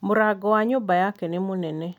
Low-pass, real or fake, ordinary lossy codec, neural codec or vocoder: 19.8 kHz; real; none; none